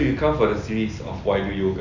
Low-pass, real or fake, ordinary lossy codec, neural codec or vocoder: 7.2 kHz; real; none; none